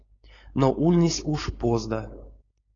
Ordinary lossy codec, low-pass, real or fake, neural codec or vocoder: AAC, 32 kbps; 7.2 kHz; fake; codec, 16 kHz, 4.8 kbps, FACodec